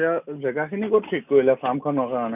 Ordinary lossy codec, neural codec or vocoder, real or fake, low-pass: none; none; real; 3.6 kHz